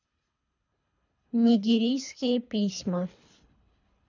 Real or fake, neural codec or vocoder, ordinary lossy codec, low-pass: fake; codec, 24 kHz, 3 kbps, HILCodec; none; 7.2 kHz